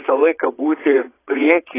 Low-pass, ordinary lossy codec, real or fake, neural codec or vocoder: 3.6 kHz; AAC, 16 kbps; fake; vocoder, 22.05 kHz, 80 mel bands, Vocos